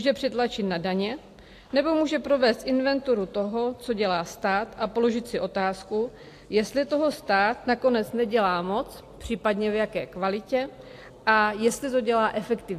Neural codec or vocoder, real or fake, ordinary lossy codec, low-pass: none; real; AAC, 64 kbps; 14.4 kHz